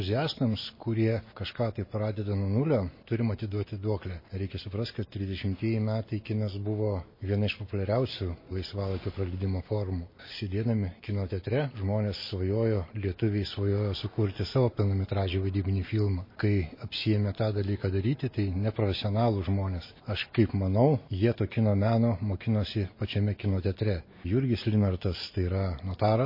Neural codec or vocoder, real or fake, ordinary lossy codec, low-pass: none; real; MP3, 24 kbps; 5.4 kHz